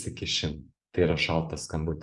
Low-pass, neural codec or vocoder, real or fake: 10.8 kHz; vocoder, 24 kHz, 100 mel bands, Vocos; fake